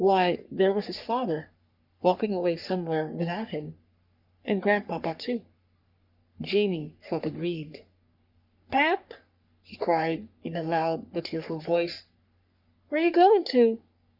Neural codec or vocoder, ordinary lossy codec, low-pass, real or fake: codec, 44.1 kHz, 3.4 kbps, Pupu-Codec; Opus, 64 kbps; 5.4 kHz; fake